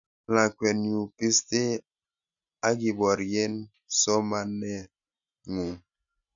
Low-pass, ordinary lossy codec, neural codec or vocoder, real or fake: 7.2 kHz; none; none; real